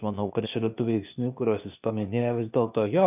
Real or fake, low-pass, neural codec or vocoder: fake; 3.6 kHz; codec, 16 kHz, 0.8 kbps, ZipCodec